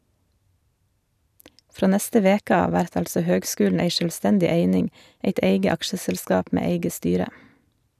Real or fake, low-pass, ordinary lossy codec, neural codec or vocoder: real; 14.4 kHz; none; none